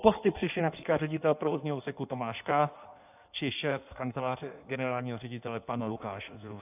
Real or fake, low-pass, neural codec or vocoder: fake; 3.6 kHz; codec, 16 kHz in and 24 kHz out, 1.1 kbps, FireRedTTS-2 codec